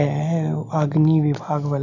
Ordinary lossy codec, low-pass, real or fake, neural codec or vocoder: none; 7.2 kHz; real; none